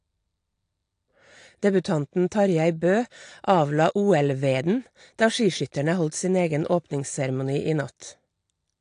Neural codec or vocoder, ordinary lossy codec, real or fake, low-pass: none; AAC, 48 kbps; real; 9.9 kHz